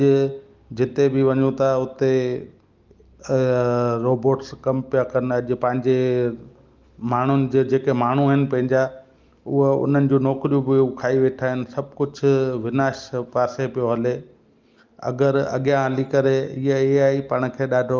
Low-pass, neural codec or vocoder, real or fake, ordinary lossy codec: 7.2 kHz; none; real; Opus, 32 kbps